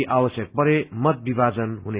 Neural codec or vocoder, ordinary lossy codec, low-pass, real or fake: none; Opus, 64 kbps; 3.6 kHz; real